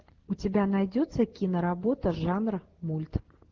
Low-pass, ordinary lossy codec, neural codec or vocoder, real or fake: 7.2 kHz; Opus, 16 kbps; none; real